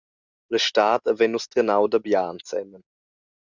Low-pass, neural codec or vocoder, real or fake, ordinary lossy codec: 7.2 kHz; none; real; Opus, 64 kbps